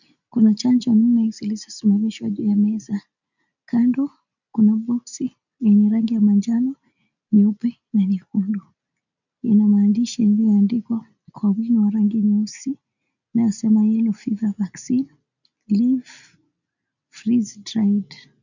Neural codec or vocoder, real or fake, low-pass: none; real; 7.2 kHz